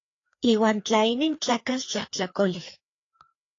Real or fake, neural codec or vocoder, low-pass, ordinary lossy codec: fake; codec, 16 kHz, 2 kbps, FreqCodec, larger model; 7.2 kHz; AAC, 32 kbps